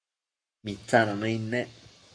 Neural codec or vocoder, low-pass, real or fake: codec, 44.1 kHz, 7.8 kbps, Pupu-Codec; 9.9 kHz; fake